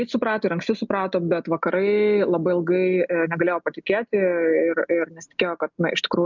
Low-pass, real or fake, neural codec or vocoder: 7.2 kHz; real; none